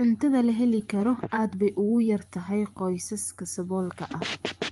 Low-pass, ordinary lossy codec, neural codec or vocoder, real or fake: 10.8 kHz; Opus, 32 kbps; vocoder, 24 kHz, 100 mel bands, Vocos; fake